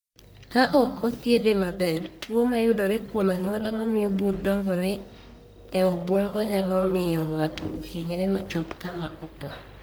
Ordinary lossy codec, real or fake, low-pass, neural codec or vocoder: none; fake; none; codec, 44.1 kHz, 1.7 kbps, Pupu-Codec